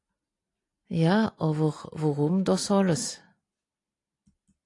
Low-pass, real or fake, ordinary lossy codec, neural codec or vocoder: 10.8 kHz; real; AAC, 48 kbps; none